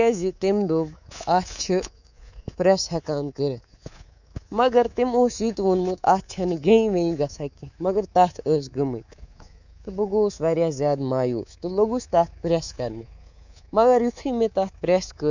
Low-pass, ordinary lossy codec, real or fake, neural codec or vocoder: 7.2 kHz; none; fake; codec, 24 kHz, 3.1 kbps, DualCodec